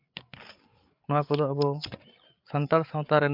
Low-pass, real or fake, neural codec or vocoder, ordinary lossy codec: 5.4 kHz; fake; codec, 16 kHz, 8 kbps, FreqCodec, larger model; MP3, 48 kbps